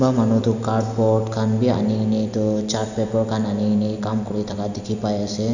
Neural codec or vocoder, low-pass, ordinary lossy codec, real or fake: none; 7.2 kHz; none; real